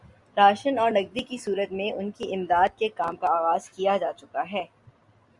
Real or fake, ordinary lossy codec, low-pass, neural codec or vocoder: real; Opus, 64 kbps; 10.8 kHz; none